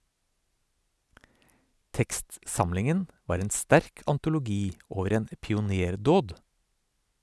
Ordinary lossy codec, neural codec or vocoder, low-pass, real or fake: none; none; none; real